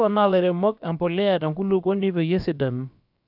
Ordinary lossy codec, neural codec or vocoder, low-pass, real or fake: AAC, 48 kbps; codec, 16 kHz, about 1 kbps, DyCAST, with the encoder's durations; 5.4 kHz; fake